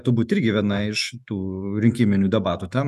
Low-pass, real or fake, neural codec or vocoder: 14.4 kHz; fake; autoencoder, 48 kHz, 128 numbers a frame, DAC-VAE, trained on Japanese speech